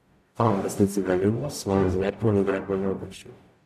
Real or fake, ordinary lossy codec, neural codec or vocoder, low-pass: fake; AAC, 96 kbps; codec, 44.1 kHz, 0.9 kbps, DAC; 14.4 kHz